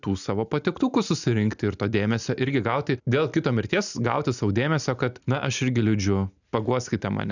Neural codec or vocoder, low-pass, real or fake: none; 7.2 kHz; real